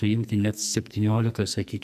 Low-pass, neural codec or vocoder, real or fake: 14.4 kHz; codec, 44.1 kHz, 2.6 kbps, SNAC; fake